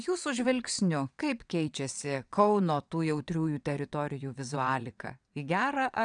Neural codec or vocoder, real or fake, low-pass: vocoder, 22.05 kHz, 80 mel bands, WaveNeXt; fake; 9.9 kHz